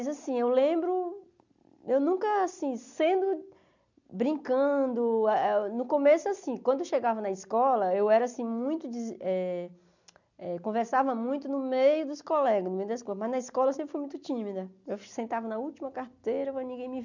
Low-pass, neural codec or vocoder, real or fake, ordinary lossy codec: 7.2 kHz; none; real; none